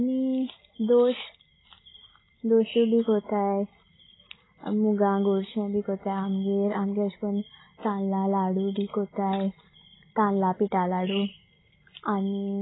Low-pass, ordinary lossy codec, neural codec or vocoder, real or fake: 7.2 kHz; AAC, 16 kbps; none; real